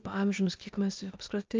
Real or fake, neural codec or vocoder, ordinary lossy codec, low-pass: fake; codec, 16 kHz, 0.8 kbps, ZipCodec; Opus, 24 kbps; 7.2 kHz